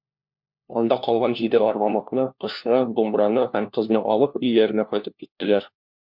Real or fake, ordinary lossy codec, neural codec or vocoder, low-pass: fake; MP3, 48 kbps; codec, 16 kHz, 1 kbps, FunCodec, trained on LibriTTS, 50 frames a second; 5.4 kHz